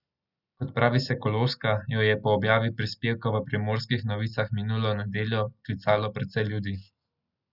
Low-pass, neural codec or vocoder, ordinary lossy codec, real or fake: 5.4 kHz; none; none; real